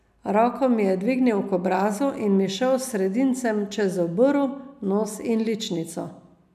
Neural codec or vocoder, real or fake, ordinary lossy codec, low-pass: none; real; none; 14.4 kHz